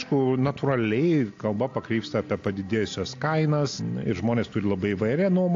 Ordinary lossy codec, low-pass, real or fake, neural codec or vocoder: AAC, 48 kbps; 7.2 kHz; real; none